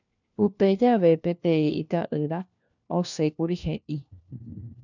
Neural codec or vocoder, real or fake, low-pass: codec, 16 kHz, 1 kbps, FunCodec, trained on LibriTTS, 50 frames a second; fake; 7.2 kHz